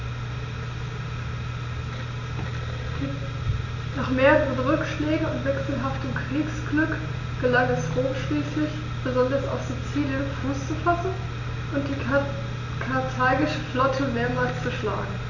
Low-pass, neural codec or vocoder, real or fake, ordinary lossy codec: 7.2 kHz; none; real; none